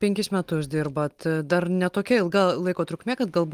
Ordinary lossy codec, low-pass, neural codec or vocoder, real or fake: Opus, 24 kbps; 14.4 kHz; none; real